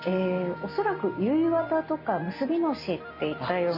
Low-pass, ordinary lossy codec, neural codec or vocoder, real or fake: 5.4 kHz; MP3, 48 kbps; none; real